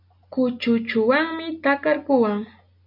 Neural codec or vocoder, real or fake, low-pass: none; real; 5.4 kHz